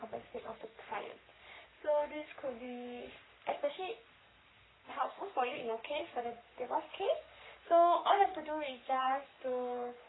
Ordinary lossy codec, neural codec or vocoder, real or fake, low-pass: AAC, 16 kbps; codec, 44.1 kHz, 3.4 kbps, Pupu-Codec; fake; 7.2 kHz